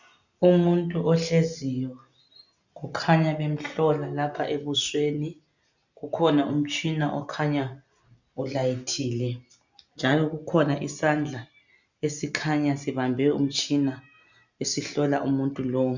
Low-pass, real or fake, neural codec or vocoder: 7.2 kHz; real; none